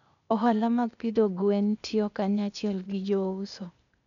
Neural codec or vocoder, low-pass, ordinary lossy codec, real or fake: codec, 16 kHz, 0.8 kbps, ZipCodec; 7.2 kHz; none; fake